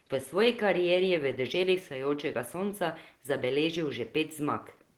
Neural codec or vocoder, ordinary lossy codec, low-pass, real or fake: none; Opus, 16 kbps; 19.8 kHz; real